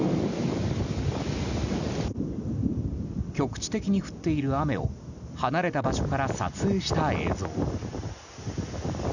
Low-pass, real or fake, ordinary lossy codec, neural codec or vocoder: 7.2 kHz; real; none; none